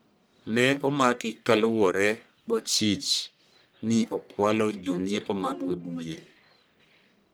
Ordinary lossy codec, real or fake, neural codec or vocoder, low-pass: none; fake; codec, 44.1 kHz, 1.7 kbps, Pupu-Codec; none